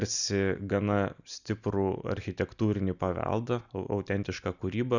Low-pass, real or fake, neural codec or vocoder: 7.2 kHz; real; none